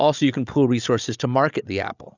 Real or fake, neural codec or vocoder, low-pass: fake; codec, 16 kHz, 16 kbps, FreqCodec, larger model; 7.2 kHz